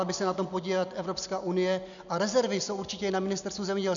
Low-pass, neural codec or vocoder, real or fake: 7.2 kHz; none; real